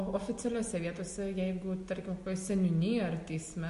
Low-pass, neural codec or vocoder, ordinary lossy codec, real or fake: 14.4 kHz; none; MP3, 48 kbps; real